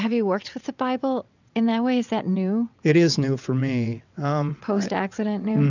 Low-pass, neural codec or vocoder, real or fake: 7.2 kHz; vocoder, 22.05 kHz, 80 mel bands, WaveNeXt; fake